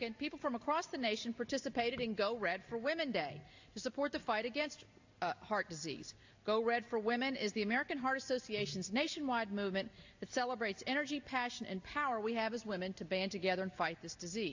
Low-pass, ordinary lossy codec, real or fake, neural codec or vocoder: 7.2 kHz; AAC, 48 kbps; real; none